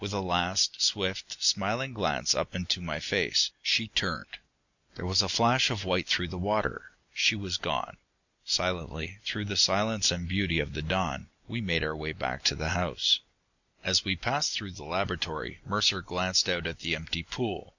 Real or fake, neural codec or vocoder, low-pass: real; none; 7.2 kHz